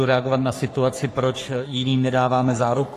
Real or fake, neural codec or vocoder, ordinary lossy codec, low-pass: fake; codec, 44.1 kHz, 3.4 kbps, Pupu-Codec; AAC, 48 kbps; 14.4 kHz